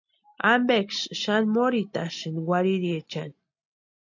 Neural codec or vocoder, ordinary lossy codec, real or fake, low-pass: none; AAC, 48 kbps; real; 7.2 kHz